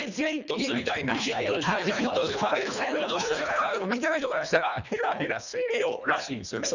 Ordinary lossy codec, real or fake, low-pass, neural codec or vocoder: none; fake; 7.2 kHz; codec, 24 kHz, 1.5 kbps, HILCodec